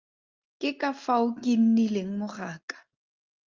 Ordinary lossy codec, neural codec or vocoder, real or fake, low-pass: Opus, 24 kbps; none; real; 7.2 kHz